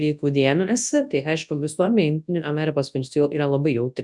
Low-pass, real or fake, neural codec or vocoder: 10.8 kHz; fake; codec, 24 kHz, 0.9 kbps, WavTokenizer, large speech release